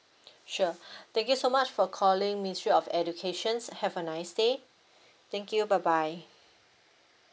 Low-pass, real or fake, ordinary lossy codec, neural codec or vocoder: none; real; none; none